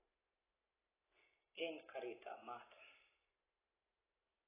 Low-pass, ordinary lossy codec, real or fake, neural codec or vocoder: 3.6 kHz; AAC, 16 kbps; real; none